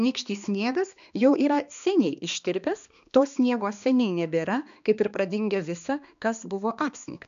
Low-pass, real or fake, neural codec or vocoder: 7.2 kHz; fake; codec, 16 kHz, 2 kbps, X-Codec, HuBERT features, trained on balanced general audio